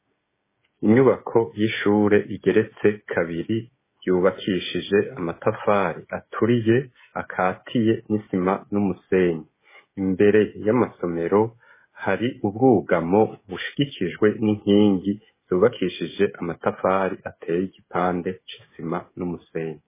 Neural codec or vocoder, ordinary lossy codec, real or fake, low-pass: codec, 16 kHz, 16 kbps, FreqCodec, smaller model; MP3, 16 kbps; fake; 3.6 kHz